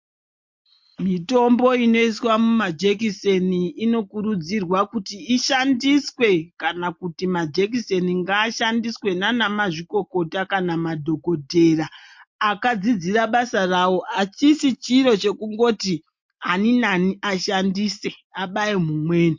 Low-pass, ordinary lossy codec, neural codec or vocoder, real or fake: 7.2 kHz; MP3, 48 kbps; none; real